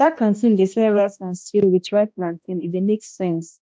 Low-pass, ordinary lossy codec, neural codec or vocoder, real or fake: none; none; codec, 16 kHz, 1 kbps, X-Codec, HuBERT features, trained on balanced general audio; fake